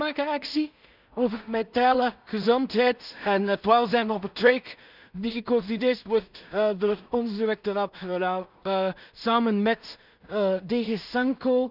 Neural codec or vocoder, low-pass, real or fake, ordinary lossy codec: codec, 16 kHz in and 24 kHz out, 0.4 kbps, LongCat-Audio-Codec, two codebook decoder; 5.4 kHz; fake; none